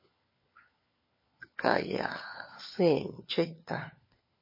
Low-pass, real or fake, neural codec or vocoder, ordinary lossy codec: 5.4 kHz; fake; codec, 16 kHz, 4 kbps, FunCodec, trained on LibriTTS, 50 frames a second; MP3, 24 kbps